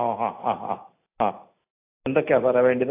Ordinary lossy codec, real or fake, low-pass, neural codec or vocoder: AAC, 24 kbps; real; 3.6 kHz; none